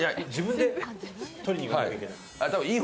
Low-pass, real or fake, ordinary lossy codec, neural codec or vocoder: none; real; none; none